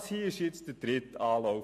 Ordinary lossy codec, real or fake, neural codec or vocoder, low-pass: MP3, 64 kbps; real; none; 14.4 kHz